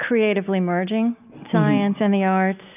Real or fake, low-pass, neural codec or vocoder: real; 3.6 kHz; none